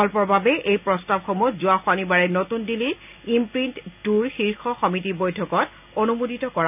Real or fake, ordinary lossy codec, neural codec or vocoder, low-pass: real; none; none; 3.6 kHz